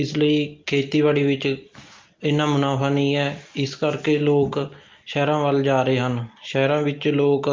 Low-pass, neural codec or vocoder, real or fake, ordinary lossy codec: 7.2 kHz; none; real; Opus, 32 kbps